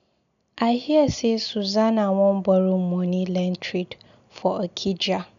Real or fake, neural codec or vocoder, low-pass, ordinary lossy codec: real; none; 7.2 kHz; none